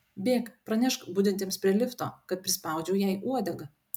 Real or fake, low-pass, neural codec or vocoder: real; 19.8 kHz; none